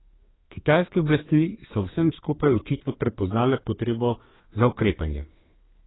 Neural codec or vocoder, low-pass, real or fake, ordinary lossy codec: codec, 32 kHz, 1.9 kbps, SNAC; 7.2 kHz; fake; AAC, 16 kbps